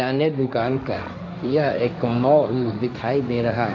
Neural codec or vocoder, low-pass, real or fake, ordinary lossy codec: codec, 16 kHz, 1.1 kbps, Voila-Tokenizer; 7.2 kHz; fake; AAC, 48 kbps